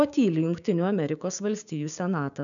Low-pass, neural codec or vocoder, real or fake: 7.2 kHz; codec, 16 kHz, 6 kbps, DAC; fake